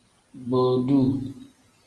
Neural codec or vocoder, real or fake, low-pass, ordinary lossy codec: none; real; 10.8 kHz; Opus, 24 kbps